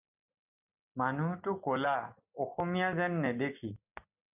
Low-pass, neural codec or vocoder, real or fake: 3.6 kHz; none; real